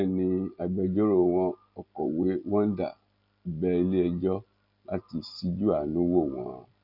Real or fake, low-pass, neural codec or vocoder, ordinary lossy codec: real; 5.4 kHz; none; none